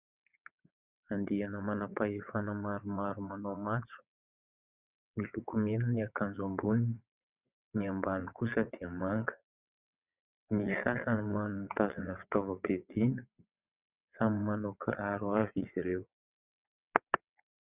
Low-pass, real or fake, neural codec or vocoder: 3.6 kHz; fake; vocoder, 22.05 kHz, 80 mel bands, WaveNeXt